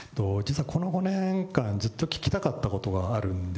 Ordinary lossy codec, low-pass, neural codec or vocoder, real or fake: none; none; none; real